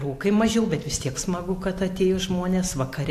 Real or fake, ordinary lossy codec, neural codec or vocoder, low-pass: real; AAC, 64 kbps; none; 14.4 kHz